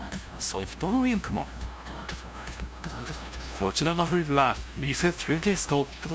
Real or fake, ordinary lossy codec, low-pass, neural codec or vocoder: fake; none; none; codec, 16 kHz, 0.5 kbps, FunCodec, trained on LibriTTS, 25 frames a second